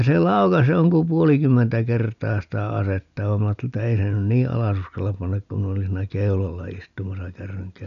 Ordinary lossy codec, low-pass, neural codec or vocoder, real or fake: none; 7.2 kHz; none; real